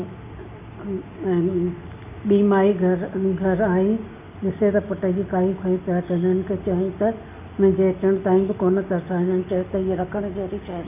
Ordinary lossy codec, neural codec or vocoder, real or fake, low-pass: none; none; real; 3.6 kHz